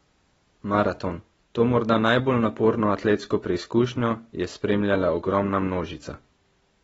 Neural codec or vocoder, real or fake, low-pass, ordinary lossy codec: none; real; 10.8 kHz; AAC, 24 kbps